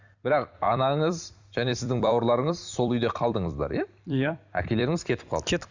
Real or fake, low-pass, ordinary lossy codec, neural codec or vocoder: fake; 7.2 kHz; Opus, 64 kbps; vocoder, 44.1 kHz, 128 mel bands every 256 samples, BigVGAN v2